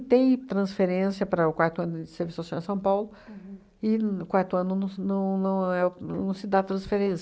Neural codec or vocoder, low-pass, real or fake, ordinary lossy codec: none; none; real; none